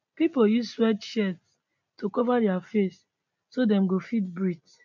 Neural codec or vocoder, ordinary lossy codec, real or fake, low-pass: none; none; real; 7.2 kHz